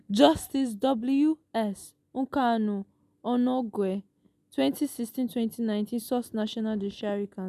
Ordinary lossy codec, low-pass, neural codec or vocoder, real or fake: none; 14.4 kHz; none; real